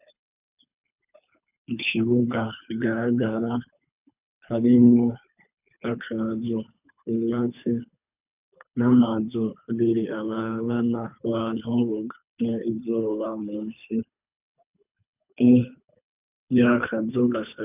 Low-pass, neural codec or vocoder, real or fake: 3.6 kHz; codec, 24 kHz, 3 kbps, HILCodec; fake